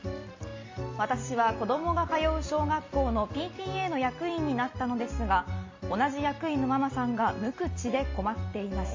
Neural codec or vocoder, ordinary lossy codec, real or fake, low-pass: none; MP3, 32 kbps; real; 7.2 kHz